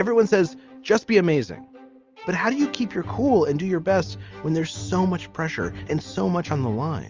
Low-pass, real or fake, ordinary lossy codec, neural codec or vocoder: 7.2 kHz; real; Opus, 32 kbps; none